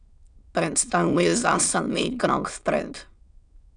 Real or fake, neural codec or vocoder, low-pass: fake; autoencoder, 22.05 kHz, a latent of 192 numbers a frame, VITS, trained on many speakers; 9.9 kHz